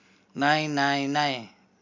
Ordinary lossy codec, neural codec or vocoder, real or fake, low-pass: MP3, 48 kbps; none; real; 7.2 kHz